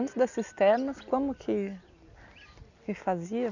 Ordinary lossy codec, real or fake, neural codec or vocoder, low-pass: none; real; none; 7.2 kHz